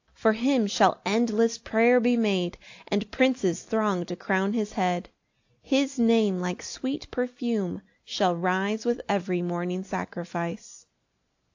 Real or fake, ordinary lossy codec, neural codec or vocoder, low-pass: real; AAC, 48 kbps; none; 7.2 kHz